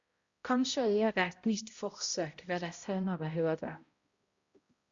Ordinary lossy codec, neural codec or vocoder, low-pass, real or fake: Opus, 64 kbps; codec, 16 kHz, 0.5 kbps, X-Codec, HuBERT features, trained on balanced general audio; 7.2 kHz; fake